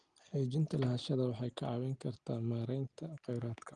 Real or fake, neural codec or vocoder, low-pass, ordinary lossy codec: real; none; 9.9 kHz; Opus, 24 kbps